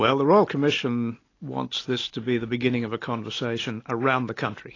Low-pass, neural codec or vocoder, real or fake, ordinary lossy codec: 7.2 kHz; none; real; AAC, 32 kbps